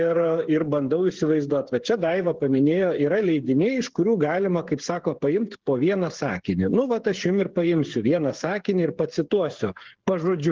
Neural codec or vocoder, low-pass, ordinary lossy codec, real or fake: codec, 16 kHz, 8 kbps, FreqCodec, smaller model; 7.2 kHz; Opus, 16 kbps; fake